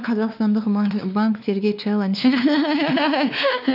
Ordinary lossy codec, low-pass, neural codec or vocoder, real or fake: none; 5.4 kHz; codec, 16 kHz, 2 kbps, X-Codec, WavLM features, trained on Multilingual LibriSpeech; fake